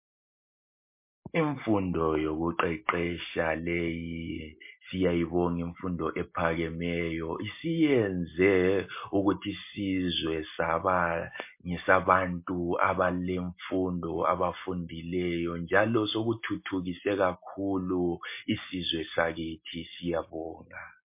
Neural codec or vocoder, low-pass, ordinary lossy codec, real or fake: none; 3.6 kHz; MP3, 24 kbps; real